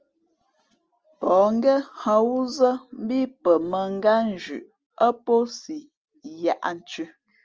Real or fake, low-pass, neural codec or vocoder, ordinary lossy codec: real; 7.2 kHz; none; Opus, 24 kbps